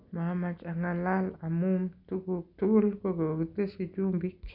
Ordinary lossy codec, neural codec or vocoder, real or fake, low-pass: none; none; real; 5.4 kHz